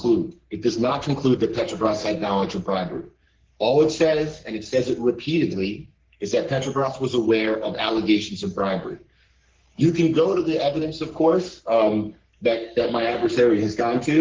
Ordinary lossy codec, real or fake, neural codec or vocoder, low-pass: Opus, 16 kbps; fake; codec, 44.1 kHz, 3.4 kbps, Pupu-Codec; 7.2 kHz